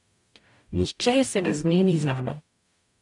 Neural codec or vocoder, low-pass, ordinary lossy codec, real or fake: codec, 44.1 kHz, 0.9 kbps, DAC; 10.8 kHz; none; fake